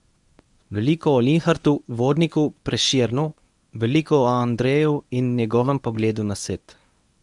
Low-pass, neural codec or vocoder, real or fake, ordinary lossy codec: 10.8 kHz; codec, 24 kHz, 0.9 kbps, WavTokenizer, medium speech release version 1; fake; none